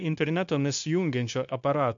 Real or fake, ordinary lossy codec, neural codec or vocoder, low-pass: fake; MP3, 64 kbps; codec, 16 kHz, 0.9 kbps, LongCat-Audio-Codec; 7.2 kHz